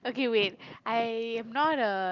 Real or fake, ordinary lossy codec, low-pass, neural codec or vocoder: real; Opus, 24 kbps; 7.2 kHz; none